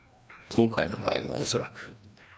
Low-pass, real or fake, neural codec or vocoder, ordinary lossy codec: none; fake; codec, 16 kHz, 1 kbps, FreqCodec, larger model; none